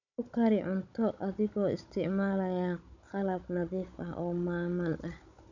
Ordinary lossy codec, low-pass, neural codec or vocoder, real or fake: none; 7.2 kHz; codec, 16 kHz, 16 kbps, FunCodec, trained on Chinese and English, 50 frames a second; fake